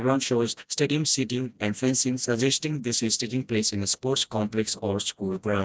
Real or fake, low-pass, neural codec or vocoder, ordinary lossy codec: fake; none; codec, 16 kHz, 1 kbps, FreqCodec, smaller model; none